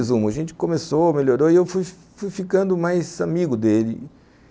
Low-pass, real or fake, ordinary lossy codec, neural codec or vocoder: none; real; none; none